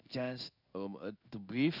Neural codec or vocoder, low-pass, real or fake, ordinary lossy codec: codec, 16 kHz in and 24 kHz out, 1 kbps, XY-Tokenizer; 5.4 kHz; fake; none